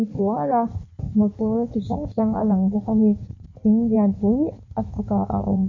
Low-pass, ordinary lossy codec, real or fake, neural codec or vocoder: 7.2 kHz; none; fake; codec, 16 kHz in and 24 kHz out, 1.1 kbps, FireRedTTS-2 codec